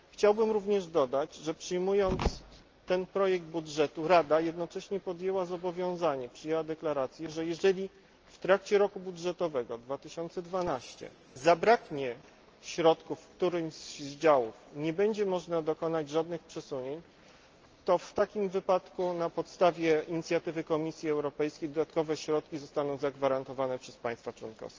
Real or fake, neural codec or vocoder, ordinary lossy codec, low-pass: real; none; Opus, 24 kbps; 7.2 kHz